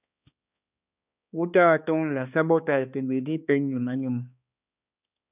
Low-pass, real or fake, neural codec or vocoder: 3.6 kHz; fake; codec, 16 kHz, 2 kbps, X-Codec, HuBERT features, trained on balanced general audio